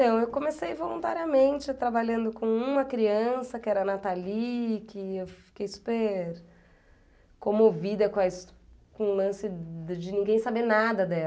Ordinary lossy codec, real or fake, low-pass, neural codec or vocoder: none; real; none; none